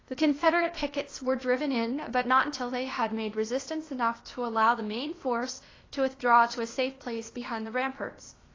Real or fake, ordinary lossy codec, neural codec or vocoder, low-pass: fake; AAC, 32 kbps; codec, 16 kHz, 0.8 kbps, ZipCodec; 7.2 kHz